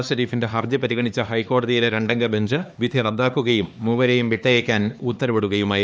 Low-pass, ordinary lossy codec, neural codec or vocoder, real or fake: none; none; codec, 16 kHz, 2 kbps, X-Codec, HuBERT features, trained on LibriSpeech; fake